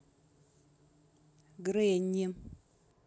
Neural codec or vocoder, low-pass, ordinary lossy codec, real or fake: none; none; none; real